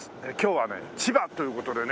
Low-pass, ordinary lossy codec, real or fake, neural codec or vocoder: none; none; real; none